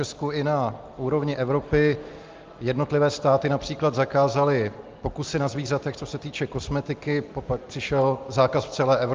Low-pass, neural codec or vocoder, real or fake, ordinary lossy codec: 7.2 kHz; none; real; Opus, 32 kbps